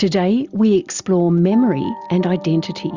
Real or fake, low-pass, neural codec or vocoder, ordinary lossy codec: real; 7.2 kHz; none; Opus, 64 kbps